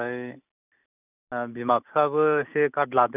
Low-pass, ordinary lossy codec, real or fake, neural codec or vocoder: 3.6 kHz; none; real; none